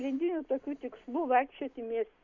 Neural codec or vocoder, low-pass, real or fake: none; 7.2 kHz; real